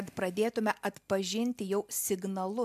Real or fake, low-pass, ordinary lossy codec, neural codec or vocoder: real; 14.4 kHz; MP3, 96 kbps; none